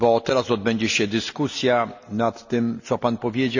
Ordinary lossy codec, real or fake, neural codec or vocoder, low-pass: none; real; none; 7.2 kHz